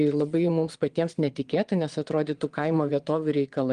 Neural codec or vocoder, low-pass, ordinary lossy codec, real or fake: vocoder, 22.05 kHz, 80 mel bands, Vocos; 9.9 kHz; Opus, 24 kbps; fake